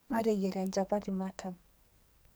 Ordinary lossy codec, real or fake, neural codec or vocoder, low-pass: none; fake; codec, 44.1 kHz, 2.6 kbps, SNAC; none